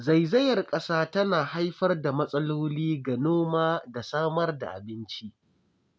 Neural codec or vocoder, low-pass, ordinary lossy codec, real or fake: none; none; none; real